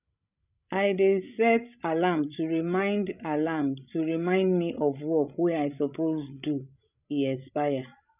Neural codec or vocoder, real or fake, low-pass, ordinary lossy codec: codec, 16 kHz, 16 kbps, FreqCodec, larger model; fake; 3.6 kHz; none